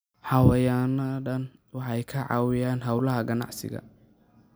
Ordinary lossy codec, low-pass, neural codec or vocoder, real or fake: none; none; none; real